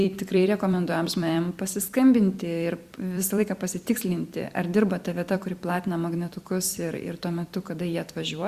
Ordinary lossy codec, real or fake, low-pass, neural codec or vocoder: Opus, 64 kbps; fake; 14.4 kHz; vocoder, 44.1 kHz, 128 mel bands every 256 samples, BigVGAN v2